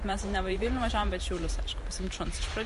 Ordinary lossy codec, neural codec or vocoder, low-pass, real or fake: MP3, 48 kbps; vocoder, 44.1 kHz, 128 mel bands every 512 samples, BigVGAN v2; 14.4 kHz; fake